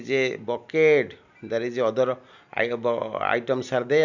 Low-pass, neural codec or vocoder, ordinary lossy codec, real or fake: 7.2 kHz; none; none; real